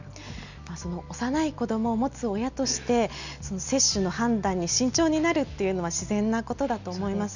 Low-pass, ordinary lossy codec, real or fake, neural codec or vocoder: 7.2 kHz; none; real; none